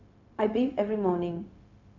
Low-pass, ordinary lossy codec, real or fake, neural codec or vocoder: 7.2 kHz; AAC, 48 kbps; fake; codec, 16 kHz, 0.4 kbps, LongCat-Audio-Codec